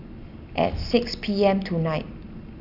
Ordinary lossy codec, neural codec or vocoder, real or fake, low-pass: none; none; real; 5.4 kHz